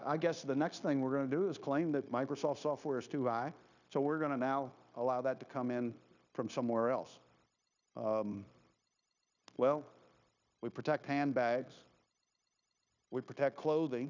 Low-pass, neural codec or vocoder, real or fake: 7.2 kHz; codec, 16 kHz, 0.9 kbps, LongCat-Audio-Codec; fake